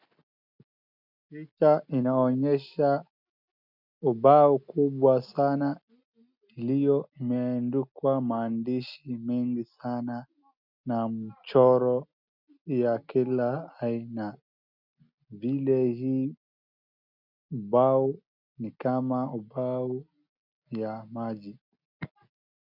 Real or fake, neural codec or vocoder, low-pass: real; none; 5.4 kHz